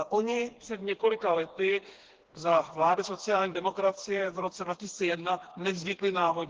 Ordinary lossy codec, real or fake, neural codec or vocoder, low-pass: Opus, 16 kbps; fake; codec, 16 kHz, 2 kbps, FreqCodec, smaller model; 7.2 kHz